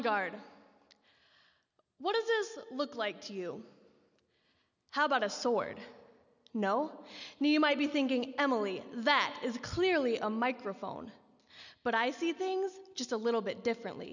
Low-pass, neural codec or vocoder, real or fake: 7.2 kHz; none; real